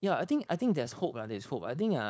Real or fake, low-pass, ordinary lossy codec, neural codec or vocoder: fake; none; none; codec, 16 kHz, 4.8 kbps, FACodec